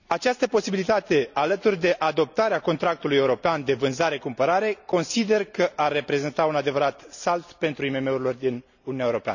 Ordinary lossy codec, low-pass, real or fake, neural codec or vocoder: none; 7.2 kHz; real; none